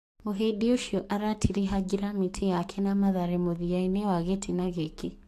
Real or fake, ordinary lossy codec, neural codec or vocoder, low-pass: fake; AAC, 64 kbps; codec, 44.1 kHz, 7.8 kbps, DAC; 14.4 kHz